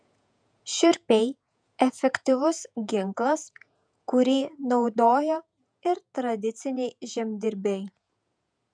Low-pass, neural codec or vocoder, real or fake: 9.9 kHz; vocoder, 48 kHz, 128 mel bands, Vocos; fake